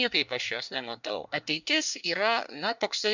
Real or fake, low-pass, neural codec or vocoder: fake; 7.2 kHz; codec, 24 kHz, 1 kbps, SNAC